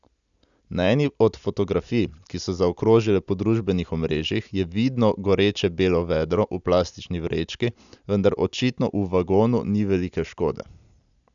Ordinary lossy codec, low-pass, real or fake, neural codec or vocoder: none; 7.2 kHz; real; none